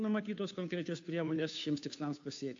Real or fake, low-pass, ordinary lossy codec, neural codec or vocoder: fake; 7.2 kHz; AAC, 48 kbps; codec, 16 kHz, 2 kbps, FunCodec, trained on Chinese and English, 25 frames a second